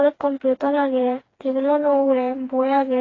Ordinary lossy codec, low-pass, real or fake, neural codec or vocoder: AAC, 32 kbps; 7.2 kHz; fake; codec, 16 kHz, 2 kbps, FreqCodec, smaller model